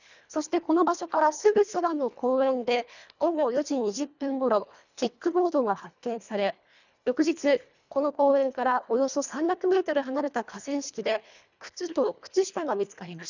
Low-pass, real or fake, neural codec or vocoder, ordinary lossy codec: 7.2 kHz; fake; codec, 24 kHz, 1.5 kbps, HILCodec; none